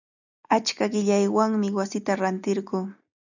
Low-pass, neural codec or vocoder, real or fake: 7.2 kHz; none; real